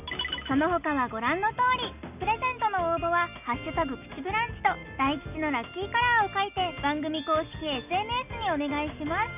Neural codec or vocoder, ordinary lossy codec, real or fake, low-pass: none; none; real; 3.6 kHz